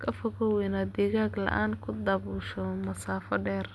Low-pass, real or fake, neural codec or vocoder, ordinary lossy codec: none; real; none; none